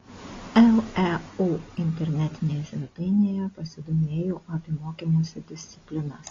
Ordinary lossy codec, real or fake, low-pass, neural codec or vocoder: AAC, 32 kbps; real; 7.2 kHz; none